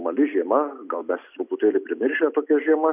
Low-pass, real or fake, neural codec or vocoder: 3.6 kHz; real; none